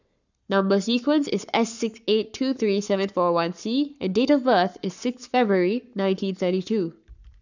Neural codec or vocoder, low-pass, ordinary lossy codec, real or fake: codec, 44.1 kHz, 7.8 kbps, Pupu-Codec; 7.2 kHz; none; fake